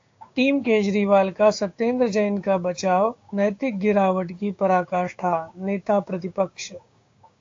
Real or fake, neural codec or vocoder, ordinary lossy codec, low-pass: fake; codec, 16 kHz, 6 kbps, DAC; AAC, 48 kbps; 7.2 kHz